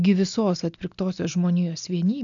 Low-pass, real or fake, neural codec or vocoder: 7.2 kHz; real; none